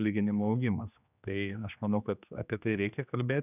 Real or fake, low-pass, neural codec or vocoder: fake; 3.6 kHz; codec, 16 kHz, 2 kbps, X-Codec, HuBERT features, trained on general audio